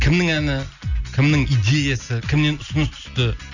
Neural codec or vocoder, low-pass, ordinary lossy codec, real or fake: none; 7.2 kHz; none; real